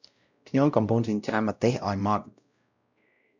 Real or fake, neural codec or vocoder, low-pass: fake; codec, 16 kHz, 0.5 kbps, X-Codec, WavLM features, trained on Multilingual LibriSpeech; 7.2 kHz